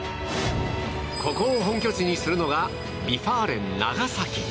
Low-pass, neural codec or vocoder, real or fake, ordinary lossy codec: none; none; real; none